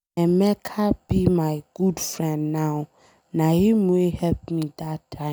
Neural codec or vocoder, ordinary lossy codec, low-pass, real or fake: none; none; none; real